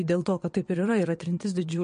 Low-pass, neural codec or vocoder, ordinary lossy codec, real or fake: 9.9 kHz; vocoder, 22.05 kHz, 80 mel bands, WaveNeXt; MP3, 48 kbps; fake